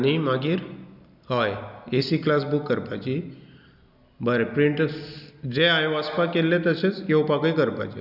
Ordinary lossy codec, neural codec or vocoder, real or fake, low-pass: none; none; real; 5.4 kHz